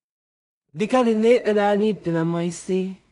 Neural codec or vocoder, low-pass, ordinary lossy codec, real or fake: codec, 16 kHz in and 24 kHz out, 0.4 kbps, LongCat-Audio-Codec, two codebook decoder; 10.8 kHz; none; fake